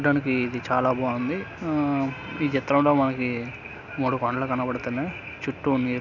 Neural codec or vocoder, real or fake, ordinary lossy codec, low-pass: none; real; none; 7.2 kHz